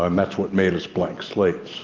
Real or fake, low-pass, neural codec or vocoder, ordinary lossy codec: real; 7.2 kHz; none; Opus, 24 kbps